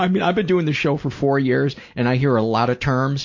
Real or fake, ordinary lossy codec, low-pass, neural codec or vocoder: real; MP3, 48 kbps; 7.2 kHz; none